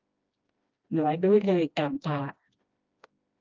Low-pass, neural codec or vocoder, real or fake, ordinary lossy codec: 7.2 kHz; codec, 16 kHz, 1 kbps, FreqCodec, smaller model; fake; Opus, 24 kbps